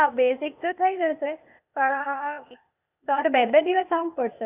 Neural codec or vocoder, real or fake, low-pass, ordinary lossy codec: codec, 16 kHz, 0.8 kbps, ZipCodec; fake; 3.6 kHz; none